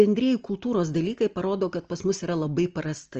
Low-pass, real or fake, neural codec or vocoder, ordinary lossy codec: 7.2 kHz; real; none; Opus, 24 kbps